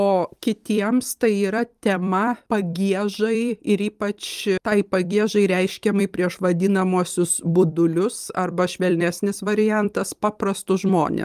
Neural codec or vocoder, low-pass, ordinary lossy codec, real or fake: vocoder, 44.1 kHz, 128 mel bands every 256 samples, BigVGAN v2; 14.4 kHz; Opus, 32 kbps; fake